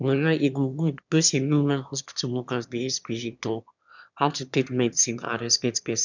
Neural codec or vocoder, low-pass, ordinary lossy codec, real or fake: autoencoder, 22.05 kHz, a latent of 192 numbers a frame, VITS, trained on one speaker; 7.2 kHz; none; fake